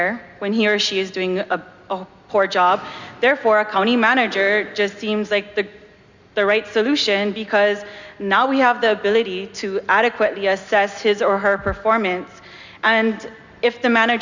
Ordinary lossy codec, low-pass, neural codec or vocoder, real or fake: Opus, 64 kbps; 7.2 kHz; none; real